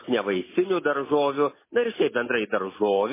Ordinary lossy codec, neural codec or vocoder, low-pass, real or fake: MP3, 16 kbps; none; 3.6 kHz; real